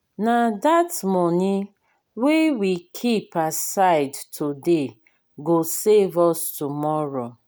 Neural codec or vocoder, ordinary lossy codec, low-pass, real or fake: none; none; none; real